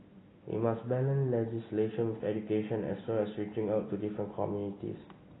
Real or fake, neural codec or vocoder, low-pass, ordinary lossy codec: real; none; 7.2 kHz; AAC, 16 kbps